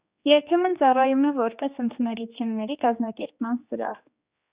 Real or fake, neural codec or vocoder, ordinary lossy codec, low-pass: fake; codec, 16 kHz, 2 kbps, X-Codec, HuBERT features, trained on general audio; Opus, 64 kbps; 3.6 kHz